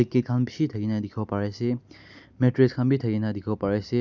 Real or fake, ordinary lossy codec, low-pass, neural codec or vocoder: real; none; 7.2 kHz; none